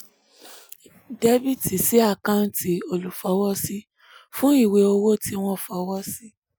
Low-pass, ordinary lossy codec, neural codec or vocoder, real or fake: none; none; none; real